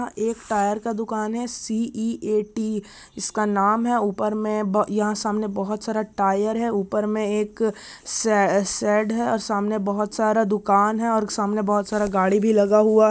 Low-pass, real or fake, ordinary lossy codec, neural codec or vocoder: none; real; none; none